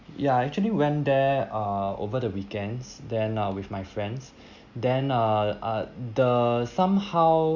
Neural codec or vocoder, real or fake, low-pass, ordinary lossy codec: none; real; 7.2 kHz; Opus, 64 kbps